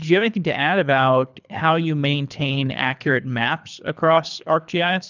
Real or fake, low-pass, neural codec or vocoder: fake; 7.2 kHz; codec, 24 kHz, 3 kbps, HILCodec